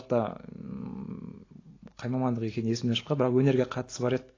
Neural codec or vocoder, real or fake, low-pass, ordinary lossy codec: none; real; 7.2 kHz; AAC, 32 kbps